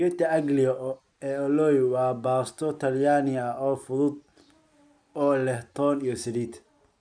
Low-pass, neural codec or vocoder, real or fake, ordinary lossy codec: 9.9 kHz; none; real; none